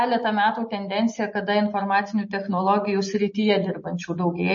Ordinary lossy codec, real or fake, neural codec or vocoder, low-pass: MP3, 32 kbps; real; none; 7.2 kHz